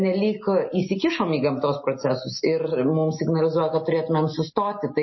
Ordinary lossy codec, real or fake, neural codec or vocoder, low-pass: MP3, 24 kbps; real; none; 7.2 kHz